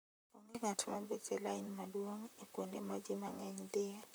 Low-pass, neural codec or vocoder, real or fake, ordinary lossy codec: none; vocoder, 44.1 kHz, 128 mel bands, Pupu-Vocoder; fake; none